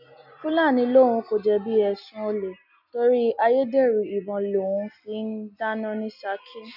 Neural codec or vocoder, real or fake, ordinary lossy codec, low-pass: none; real; none; 5.4 kHz